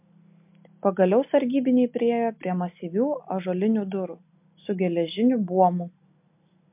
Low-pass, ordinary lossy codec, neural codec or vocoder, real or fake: 3.6 kHz; MP3, 32 kbps; none; real